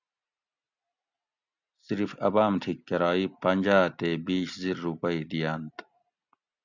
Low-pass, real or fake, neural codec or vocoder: 7.2 kHz; real; none